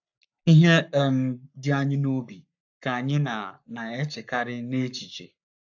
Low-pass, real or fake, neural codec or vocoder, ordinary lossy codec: 7.2 kHz; fake; codec, 44.1 kHz, 7.8 kbps, Pupu-Codec; AAC, 48 kbps